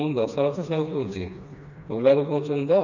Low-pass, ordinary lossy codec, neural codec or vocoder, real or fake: 7.2 kHz; none; codec, 16 kHz, 2 kbps, FreqCodec, smaller model; fake